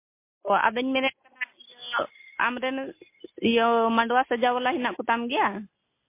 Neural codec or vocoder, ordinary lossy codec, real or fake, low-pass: none; MP3, 24 kbps; real; 3.6 kHz